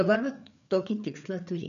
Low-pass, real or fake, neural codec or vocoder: 7.2 kHz; fake; codec, 16 kHz, 4 kbps, FreqCodec, larger model